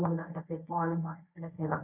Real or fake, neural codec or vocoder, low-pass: fake; codec, 24 kHz, 0.9 kbps, WavTokenizer, medium speech release version 1; 3.6 kHz